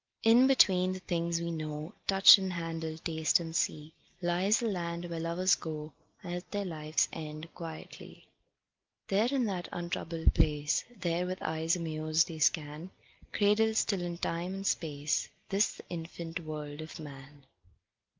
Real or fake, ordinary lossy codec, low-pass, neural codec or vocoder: real; Opus, 24 kbps; 7.2 kHz; none